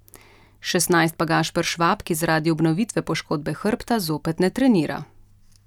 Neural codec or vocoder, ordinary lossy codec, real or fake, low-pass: none; none; real; 19.8 kHz